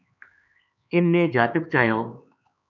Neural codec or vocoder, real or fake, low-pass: codec, 16 kHz, 4 kbps, X-Codec, HuBERT features, trained on LibriSpeech; fake; 7.2 kHz